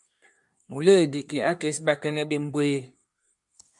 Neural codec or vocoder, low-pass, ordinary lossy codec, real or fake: codec, 24 kHz, 1 kbps, SNAC; 10.8 kHz; MP3, 64 kbps; fake